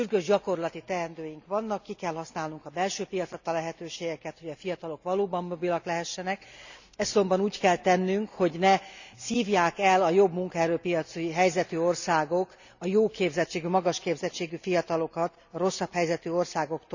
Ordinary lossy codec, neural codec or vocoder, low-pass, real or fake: none; none; 7.2 kHz; real